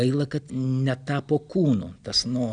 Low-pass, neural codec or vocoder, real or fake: 9.9 kHz; none; real